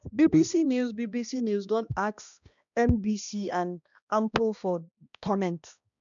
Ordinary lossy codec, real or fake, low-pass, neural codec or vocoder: none; fake; 7.2 kHz; codec, 16 kHz, 1 kbps, X-Codec, HuBERT features, trained on balanced general audio